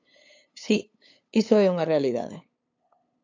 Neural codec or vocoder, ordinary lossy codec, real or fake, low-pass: codec, 16 kHz, 8 kbps, FunCodec, trained on LibriTTS, 25 frames a second; AAC, 48 kbps; fake; 7.2 kHz